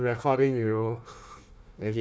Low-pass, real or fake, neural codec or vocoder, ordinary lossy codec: none; fake; codec, 16 kHz, 1 kbps, FunCodec, trained on Chinese and English, 50 frames a second; none